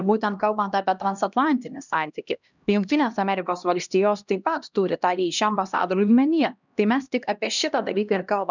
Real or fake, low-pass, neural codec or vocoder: fake; 7.2 kHz; codec, 16 kHz, 1 kbps, X-Codec, HuBERT features, trained on LibriSpeech